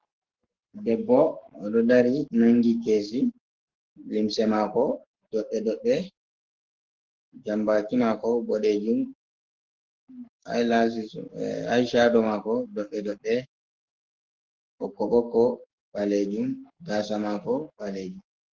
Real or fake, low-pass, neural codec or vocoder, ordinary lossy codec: fake; 7.2 kHz; codec, 44.1 kHz, 7.8 kbps, Pupu-Codec; Opus, 16 kbps